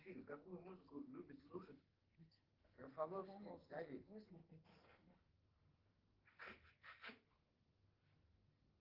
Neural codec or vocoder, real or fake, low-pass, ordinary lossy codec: codec, 44.1 kHz, 2.6 kbps, SNAC; fake; 5.4 kHz; Opus, 16 kbps